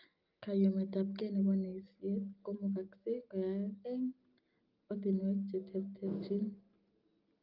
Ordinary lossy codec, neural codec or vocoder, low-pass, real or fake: Opus, 24 kbps; none; 5.4 kHz; real